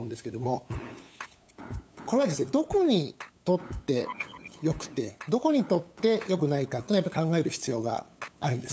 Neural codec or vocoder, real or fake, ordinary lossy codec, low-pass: codec, 16 kHz, 8 kbps, FunCodec, trained on LibriTTS, 25 frames a second; fake; none; none